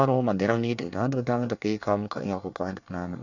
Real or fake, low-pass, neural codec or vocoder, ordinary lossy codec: fake; 7.2 kHz; codec, 24 kHz, 1 kbps, SNAC; none